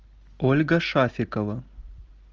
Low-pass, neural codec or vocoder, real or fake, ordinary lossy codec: 7.2 kHz; none; real; Opus, 24 kbps